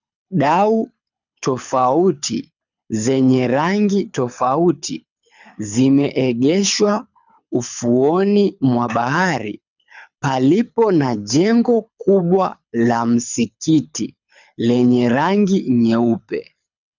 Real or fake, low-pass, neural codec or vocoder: fake; 7.2 kHz; codec, 24 kHz, 6 kbps, HILCodec